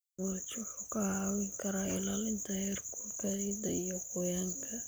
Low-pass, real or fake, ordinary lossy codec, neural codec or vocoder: none; real; none; none